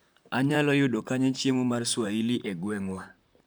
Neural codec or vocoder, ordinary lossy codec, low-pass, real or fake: vocoder, 44.1 kHz, 128 mel bands, Pupu-Vocoder; none; none; fake